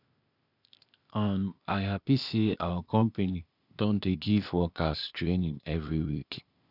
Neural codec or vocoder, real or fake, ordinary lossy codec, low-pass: codec, 16 kHz, 0.8 kbps, ZipCodec; fake; none; 5.4 kHz